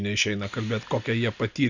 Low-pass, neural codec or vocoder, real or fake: 7.2 kHz; none; real